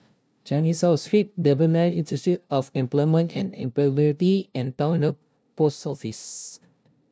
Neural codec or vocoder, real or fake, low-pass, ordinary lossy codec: codec, 16 kHz, 0.5 kbps, FunCodec, trained on LibriTTS, 25 frames a second; fake; none; none